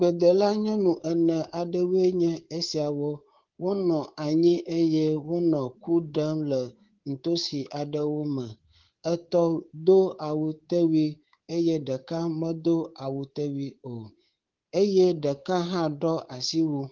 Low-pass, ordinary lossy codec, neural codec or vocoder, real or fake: 7.2 kHz; Opus, 16 kbps; codec, 24 kHz, 3.1 kbps, DualCodec; fake